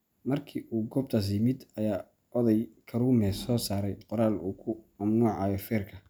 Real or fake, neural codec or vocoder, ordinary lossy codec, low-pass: real; none; none; none